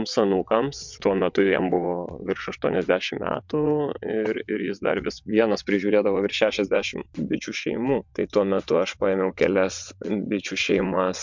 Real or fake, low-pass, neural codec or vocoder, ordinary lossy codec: fake; 7.2 kHz; vocoder, 22.05 kHz, 80 mel bands, WaveNeXt; MP3, 64 kbps